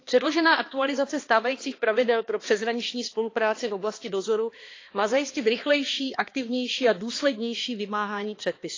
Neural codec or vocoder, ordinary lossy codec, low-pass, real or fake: codec, 16 kHz, 2 kbps, X-Codec, HuBERT features, trained on balanced general audio; AAC, 32 kbps; 7.2 kHz; fake